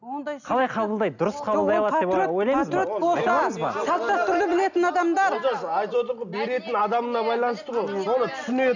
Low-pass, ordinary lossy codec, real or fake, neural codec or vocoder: 7.2 kHz; none; real; none